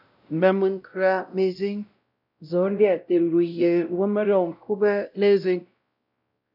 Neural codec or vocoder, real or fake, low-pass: codec, 16 kHz, 0.5 kbps, X-Codec, WavLM features, trained on Multilingual LibriSpeech; fake; 5.4 kHz